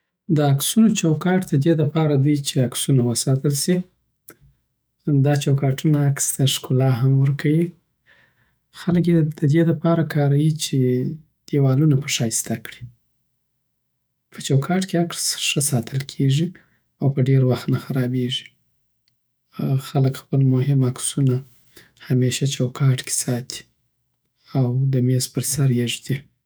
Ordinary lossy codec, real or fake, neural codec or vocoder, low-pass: none; fake; autoencoder, 48 kHz, 128 numbers a frame, DAC-VAE, trained on Japanese speech; none